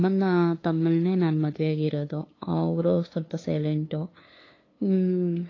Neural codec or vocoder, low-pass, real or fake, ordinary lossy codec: codec, 16 kHz, 2 kbps, FunCodec, trained on LibriTTS, 25 frames a second; 7.2 kHz; fake; AAC, 32 kbps